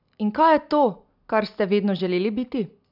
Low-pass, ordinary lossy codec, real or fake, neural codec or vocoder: 5.4 kHz; none; real; none